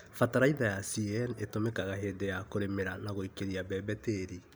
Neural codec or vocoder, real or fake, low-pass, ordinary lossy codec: none; real; none; none